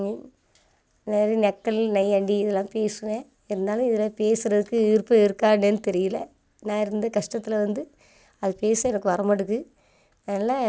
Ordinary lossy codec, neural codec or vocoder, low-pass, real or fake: none; none; none; real